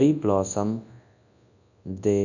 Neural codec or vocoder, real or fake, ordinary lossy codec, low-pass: codec, 24 kHz, 0.9 kbps, WavTokenizer, large speech release; fake; none; 7.2 kHz